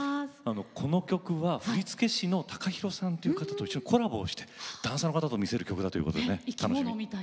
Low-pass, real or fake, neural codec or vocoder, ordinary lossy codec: none; real; none; none